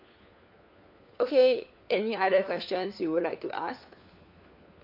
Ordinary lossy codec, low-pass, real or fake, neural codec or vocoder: AAC, 48 kbps; 5.4 kHz; fake; codec, 16 kHz, 4 kbps, FunCodec, trained on LibriTTS, 50 frames a second